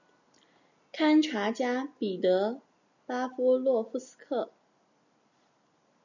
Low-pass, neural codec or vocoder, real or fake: 7.2 kHz; none; real